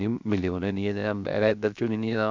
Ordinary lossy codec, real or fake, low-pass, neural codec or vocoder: MP3, 64 kbps; fake; 7.2 kHz; codec, 16 kHz, 0.7 kbps, FocalCodec